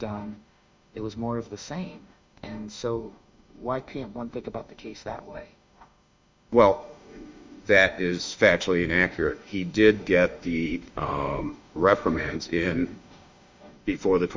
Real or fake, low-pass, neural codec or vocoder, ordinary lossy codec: fake; 7.2 kHz; autoencoder, 48 kHz, 32 numbers a frame, DAC-VAE, trained on Japanese speech; MP3, 64 kbps